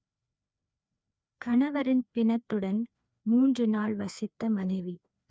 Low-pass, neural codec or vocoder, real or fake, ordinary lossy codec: none; codec, 16 kHz, 2 kbps, FreqCodec, larger model; fake; none